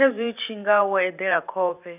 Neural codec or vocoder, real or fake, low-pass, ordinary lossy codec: vocoder, 44.1 kHz, 128 mel bands every 512 samples, BigVGAN v2; fake; 3.6 kHz; none